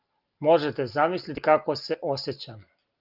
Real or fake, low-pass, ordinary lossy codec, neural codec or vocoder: real; 5.4 kHz; Opus, 32 kbps; none